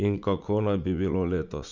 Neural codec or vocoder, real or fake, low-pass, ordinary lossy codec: vocoder, 44.1 kHz, 80 mel bands, Vocos; fake; 7.2 kHz; none